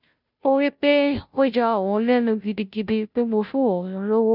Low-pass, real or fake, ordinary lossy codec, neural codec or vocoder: 5.4 kHz; fake; none; codec, 16 kHz, 0.5 kbps, FunCodec, trained on Chinese and English, 25 frames a second